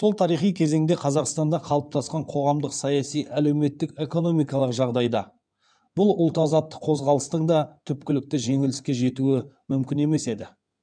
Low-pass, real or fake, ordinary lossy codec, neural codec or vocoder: 9.9 kHz; fake; none; codec, 16 kHz in and 24 kHz out, 2.2 kbps, FireRedTTS-2 codec